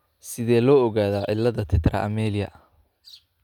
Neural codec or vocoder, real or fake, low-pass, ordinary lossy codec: none; real; 19.8 kHz; none